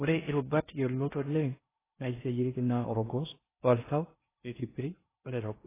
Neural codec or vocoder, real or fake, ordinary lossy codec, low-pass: codec, 16 kHz in and 24 kHz out, 0.6 kbps, FocalCodec, streaming, 4096 codes; fake; AAC, 16 kbps; 3.6 kHz